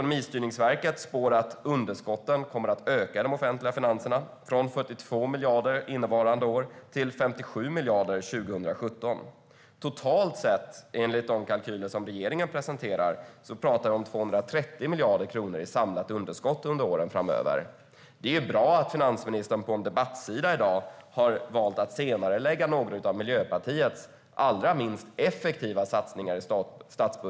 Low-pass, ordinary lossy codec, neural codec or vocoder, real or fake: none; none; none; real